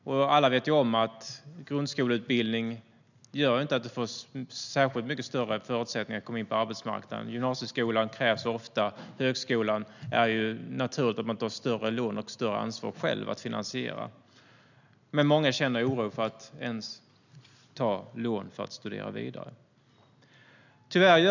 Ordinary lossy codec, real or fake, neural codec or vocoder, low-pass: none; real; none; 7.2 kHz